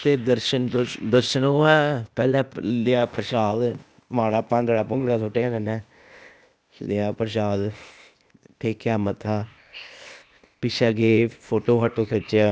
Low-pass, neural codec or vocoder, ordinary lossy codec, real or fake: none; codec, 16 kHz, 0.8 kbps, ZipCodec; none; fake